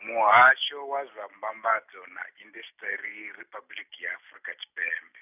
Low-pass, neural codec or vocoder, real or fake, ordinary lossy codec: 3.6 kHz; none; real; none